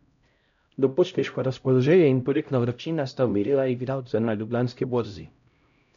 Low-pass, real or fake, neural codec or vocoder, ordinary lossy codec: 7.2 kHz; fake; codec, 16 kHz, 0.5 kbps, X-Codec, HuBERT features, trained on LibriSpeech; none